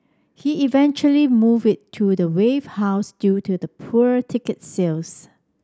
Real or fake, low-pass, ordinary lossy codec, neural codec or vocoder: real; none; none; none